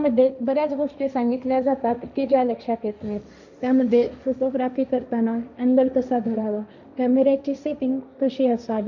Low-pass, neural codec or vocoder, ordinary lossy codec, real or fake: 7.2 kHz; codec, 16 kHz, 1.1 kbps, Voila-Tokenizer; none; fake